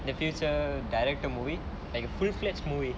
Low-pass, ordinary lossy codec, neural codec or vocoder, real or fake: none; none; none; real